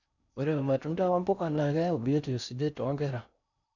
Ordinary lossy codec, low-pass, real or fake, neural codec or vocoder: none; 7.2 kHz; fake; codec, 16 kHz in and 24 kHz out, 0.6 kbps, FocalCodec, streaming, 4096 codes